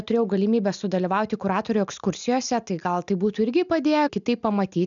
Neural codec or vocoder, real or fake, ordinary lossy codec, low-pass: none; real; MP3, 96 kbps; 7.2 kHz